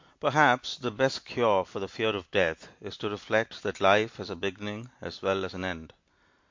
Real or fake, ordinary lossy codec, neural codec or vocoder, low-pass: real; MP3, 48 kbps; none; 7.2 kHz